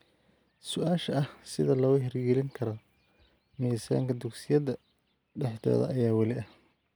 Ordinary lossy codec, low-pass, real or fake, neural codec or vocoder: none; none; real; none